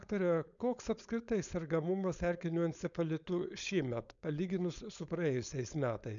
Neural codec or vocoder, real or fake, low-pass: codec, 16 kHz, 4.8 kbps, FACodec; fake; 7.2 kHz